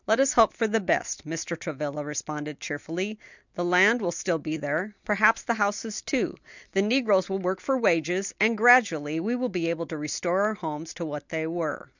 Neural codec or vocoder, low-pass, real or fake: none; 7.2 kHz; real